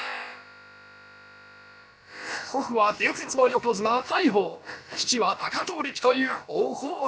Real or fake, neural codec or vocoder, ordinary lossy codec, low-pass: fake; codec, 16 kHz, about 1 kbps, DyCAST, with the encoder's durations; none; none